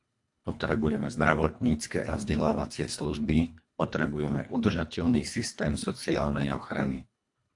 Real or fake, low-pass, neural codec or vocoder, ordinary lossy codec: fake; 10.8 kHz; codec, 24 kHz, 1.5 kbps, HILCodec; AAC, 64 kbps